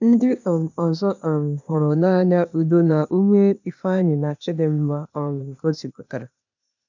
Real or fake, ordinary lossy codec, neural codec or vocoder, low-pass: fake; none; codec, 16 kHz, 0.8 kbps, ZipCodec; 7.2 kHz